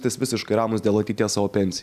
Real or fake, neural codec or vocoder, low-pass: real; none; 14.4 kHz